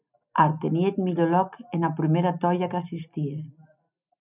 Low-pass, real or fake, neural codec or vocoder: 3.6 kHz; real; none